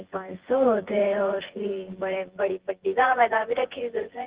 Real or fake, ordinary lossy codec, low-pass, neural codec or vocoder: fake; Opus, 16 kbps; 3.6 kHz; vocoder, 24 kHz, 100 mel bands, Vocos